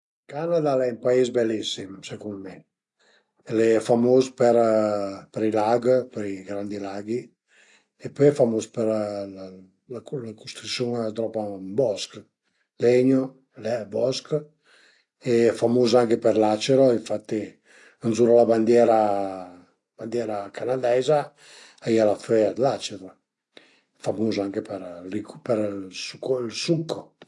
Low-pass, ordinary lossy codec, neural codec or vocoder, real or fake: 10.8 kHz; AAC, 48 kbps; none; real